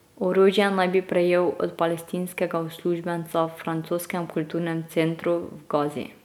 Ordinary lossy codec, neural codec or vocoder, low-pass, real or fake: none; none; 19.8 kHz; real